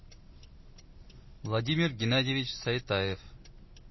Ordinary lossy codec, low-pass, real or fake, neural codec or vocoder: MP3, 24 kbps; 7.2 kHz; real; none